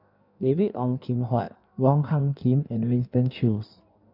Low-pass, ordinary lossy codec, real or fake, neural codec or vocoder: 5.4 kHz; none; fake; codec, 16 kHz in and 24 kHz out, 1.1 kbps, FireRedTTS-2 codec